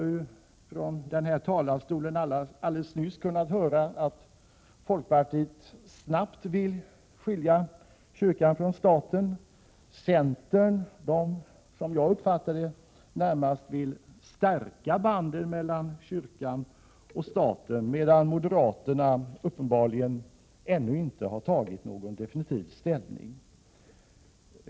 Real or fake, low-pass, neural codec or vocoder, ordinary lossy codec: real; none; none; none